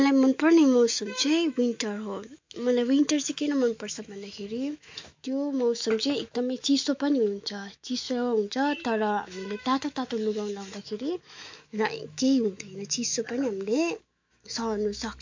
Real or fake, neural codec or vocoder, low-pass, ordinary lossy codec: fake; autoencoder, 48 kHz, 128 numbers a frame, DAC-VAE, trained on Japanese speech; 7.2 kHz; MP3, 48 kbps